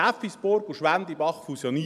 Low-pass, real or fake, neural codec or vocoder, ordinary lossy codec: 14.4 kHz; real; none; none